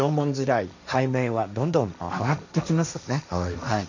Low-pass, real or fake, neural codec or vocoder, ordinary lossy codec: 7.2 kHz; fake; codec, 16 kHz, 1.1 kbps, Voila-Tokenizer; none